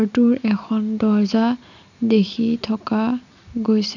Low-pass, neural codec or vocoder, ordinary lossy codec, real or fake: 7.2 kHz; none; none; real